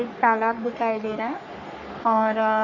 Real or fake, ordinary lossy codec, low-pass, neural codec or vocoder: fake; none; 7.2 kHz; codec, 44.1 kHz, 3.4 kbps, Pupu-Codec